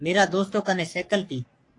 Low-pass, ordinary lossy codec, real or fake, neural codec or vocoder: 10.8 kHz; AAC, 48 kbps; fake; codec, 44.1 kHz, 3.4 kbps, Pupu-Codec